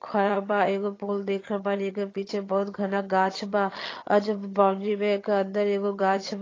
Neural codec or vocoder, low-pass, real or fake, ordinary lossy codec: vocoder, 22.05 kHz, 80 mel bands, HiFi-GAN; 7.2 kHz; fake; AAC, 32 kbps